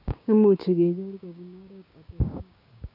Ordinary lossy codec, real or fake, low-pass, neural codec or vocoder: AAC, 48 kbps; fake; 5.4 kHz; codec, 16 kHz, 6 kbps, DAC